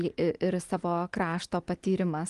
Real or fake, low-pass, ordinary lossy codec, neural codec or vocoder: real; 10.8 kHz; Opus, 24 kbps; none